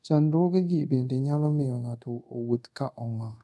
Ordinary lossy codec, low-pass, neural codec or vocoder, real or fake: none; none; codec, 24 kHz, 0.5 kbps, DualCodec; fake